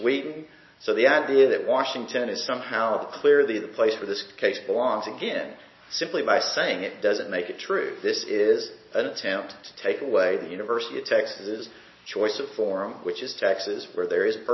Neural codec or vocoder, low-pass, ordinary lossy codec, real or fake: none; 7.2 kHz; MP3, 24 kbps; real